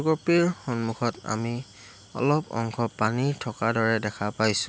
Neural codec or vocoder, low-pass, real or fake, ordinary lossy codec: none; none; real; none